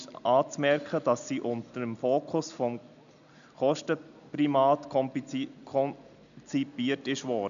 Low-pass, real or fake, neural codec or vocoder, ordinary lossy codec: 7.2 kHz; real; none; none